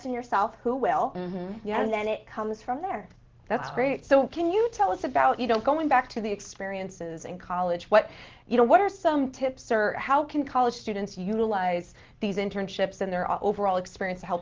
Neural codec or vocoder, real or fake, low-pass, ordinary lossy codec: none; real; 7.2 kHz; Opus, 16 kbps